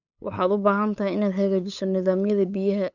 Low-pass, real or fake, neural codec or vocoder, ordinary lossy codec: 7.2 kHz; fake; codec, 16 kHz, 8 kbps, FunCodec, trained on LibriTTS, 25 frames a second; none